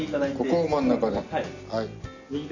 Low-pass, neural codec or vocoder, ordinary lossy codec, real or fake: 7.2 kHz; none; none; real